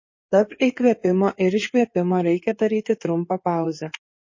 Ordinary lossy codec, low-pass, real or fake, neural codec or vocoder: MP3, 32 kbps; 7.2 kHz; fake; codec, 16 kHz in and 24 kHz out, 2.2 kbps, FireRedTTS-2 codec